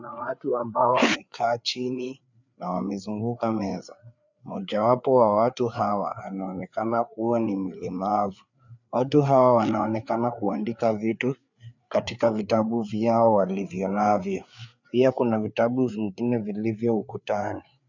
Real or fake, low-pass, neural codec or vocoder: fake; 7.2 kHz; codec, 16 kHz, 4 kbps, FreqCodec, larger model